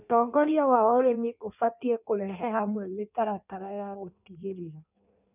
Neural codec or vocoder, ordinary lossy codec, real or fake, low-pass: codec, 16 kHz in and 24 kHz out, 1.1 kbps, FireRedTTS-2 codec; none; fake; 3.6 kHz